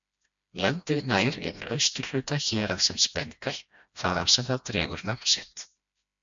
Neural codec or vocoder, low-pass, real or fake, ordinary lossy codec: codec, 16 kHz, 1 kbps, FreqCodec, smaller model; 7.2 kHz; fake; MP3, 64 kbps